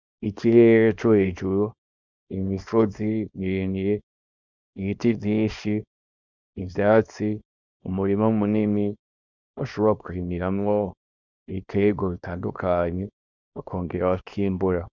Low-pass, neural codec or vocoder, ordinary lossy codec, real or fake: 7.2 kHz; codec, 24 kHz, 0.9 kbps, WavTokenizer, small release; Opus, 64 kbps; fake